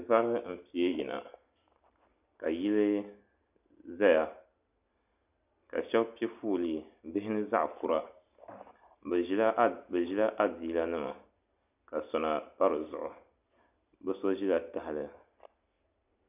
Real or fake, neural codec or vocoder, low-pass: real; none; 3.6 kHz